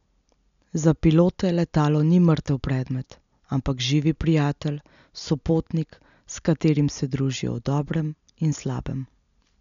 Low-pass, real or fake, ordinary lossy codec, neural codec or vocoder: 7.2 kHz; real; none; none